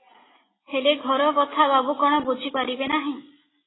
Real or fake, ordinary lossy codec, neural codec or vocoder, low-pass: real; AAC, 16 kbps; none; 7.2 kHz